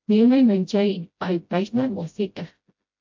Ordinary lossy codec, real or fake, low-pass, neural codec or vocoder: MP3, 48 kbps; fake; 7.2 kHz; codec, 16 kHz, 0.5 kbps, FreqCodec, smaller model